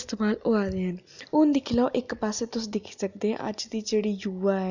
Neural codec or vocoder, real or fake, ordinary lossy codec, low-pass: none; real; none; 7.2 kHz